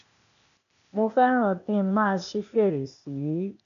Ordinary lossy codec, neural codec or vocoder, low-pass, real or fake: none; codec, 16 kHz, 0.8 kbps, ZipCodec; 7.2 kHz; fake